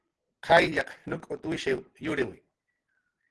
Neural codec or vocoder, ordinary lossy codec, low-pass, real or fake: none; Opus, 16 kbps; 10.8 kHz; real